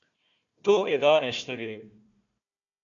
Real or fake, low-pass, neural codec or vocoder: fake; 7.2 kHz; codec, 16 kHz, 1 kbps, FunCodec, trained on Chinese and English, 50 frames a second